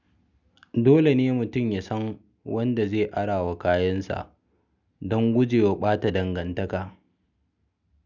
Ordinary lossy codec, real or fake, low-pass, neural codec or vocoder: none; real; 7.2 kHz; none